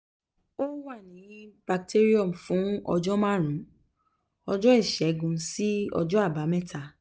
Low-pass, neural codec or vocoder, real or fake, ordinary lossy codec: none; none; real; none